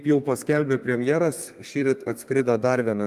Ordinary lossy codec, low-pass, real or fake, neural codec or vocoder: Opus, 32 kbps; 14.4 kHz; fake; codec, 32 kHz, 1.9 kbps, SNAC